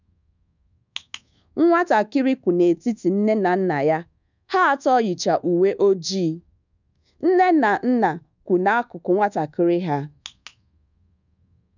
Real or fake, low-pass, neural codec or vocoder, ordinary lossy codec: fake; 7.2 kHz; codec, 24 kHz, 1.2 kbps, DualCodec; none